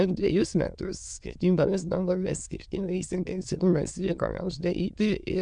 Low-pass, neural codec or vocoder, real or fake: 9.9 kHz; autoencoder, 22.05 kHz, a latent of 192 numbers a frame, VITS, trained on many speakers; fake